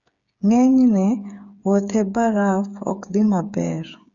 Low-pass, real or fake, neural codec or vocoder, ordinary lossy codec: 7.2 kHz; fake; codec, 16 kHz, 8 kbps, FreqCodec, smaller model; none